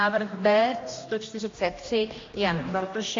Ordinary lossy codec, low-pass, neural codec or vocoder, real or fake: AAC, 32 kbps; 7.2 kHz; codec, 16 kHz, 1 kbps, X-Codec, HuBERT features, trained on general audio; fake